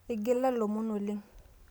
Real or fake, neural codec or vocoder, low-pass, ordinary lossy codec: real; none; none; none